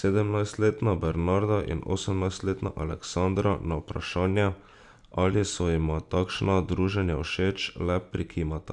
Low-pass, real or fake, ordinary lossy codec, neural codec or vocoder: 10.8 kHz; real; none; none